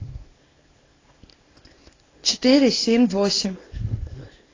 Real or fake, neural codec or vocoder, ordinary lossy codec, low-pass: fake; codec, 24 kHz, 0.9 kbps, WavTokenizer, small release; AAC, 32 kbps; 7.2 kHz